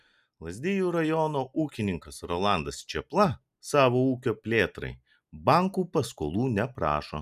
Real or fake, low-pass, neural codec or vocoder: real; 14.4 kHz; none